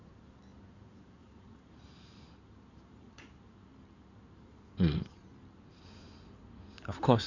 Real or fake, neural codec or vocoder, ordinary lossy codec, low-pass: fake; codec, 16 kHz in and 24 kHz out, 2.2 kbps, FireRedTTS-2 codec; none; 7.2 kHz